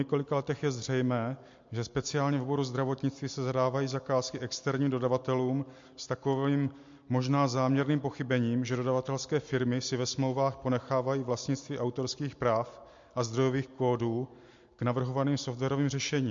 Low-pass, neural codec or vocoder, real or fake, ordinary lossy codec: 7.2 kHz; none; real; MP3, 48 kbps